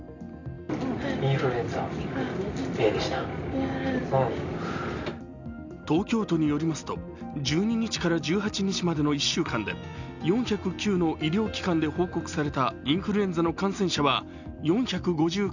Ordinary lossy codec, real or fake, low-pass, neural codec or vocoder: none; real; 7.2 kHz; none